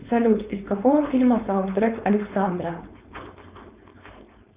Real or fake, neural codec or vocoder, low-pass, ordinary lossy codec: fake; codec, 16 kHz, 4.8 kbps, FACodec; 3.6 kHz; Opus, 64 kbps